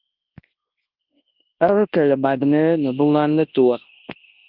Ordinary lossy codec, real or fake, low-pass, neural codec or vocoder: Opus, 32 kbps; fake; 5.4 kHz; codec, 24 kHz, 0.9 kbps, WavTokenizer, large speech release